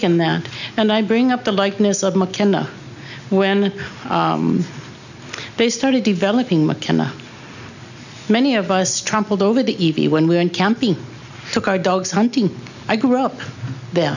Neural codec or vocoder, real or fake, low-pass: none; real; 7.2 kHz